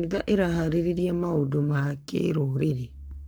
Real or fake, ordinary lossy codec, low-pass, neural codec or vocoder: fake; none; none; codec, 44.1 kHz, 7.8 kbps, Pupu-Codec